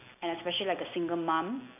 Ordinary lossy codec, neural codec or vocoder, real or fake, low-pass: none; none; real; 3.6 kHz